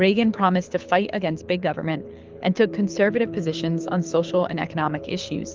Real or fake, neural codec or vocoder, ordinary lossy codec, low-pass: fake; codec, 24 kHz, 6 kbps, HILCodec; Opus, 24 kbps; 7.2 kHz